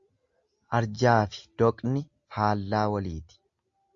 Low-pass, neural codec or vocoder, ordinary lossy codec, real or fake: 7.2 kHz; none; Opus, 64 kbps; real